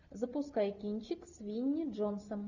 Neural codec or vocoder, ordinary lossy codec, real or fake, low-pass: none; Opus, 64 kbps; real; 7.2 kHz